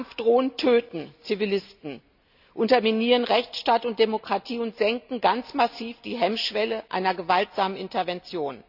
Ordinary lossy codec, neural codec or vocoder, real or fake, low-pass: none; none; real; 5.4 kHz